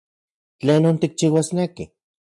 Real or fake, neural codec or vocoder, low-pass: real; none; 10.8 kHz